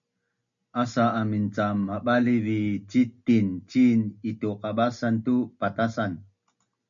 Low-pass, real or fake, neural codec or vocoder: 7.2 kHz; real; none